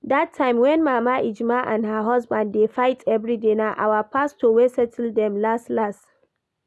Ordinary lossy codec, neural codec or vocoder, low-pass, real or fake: none; none; none; real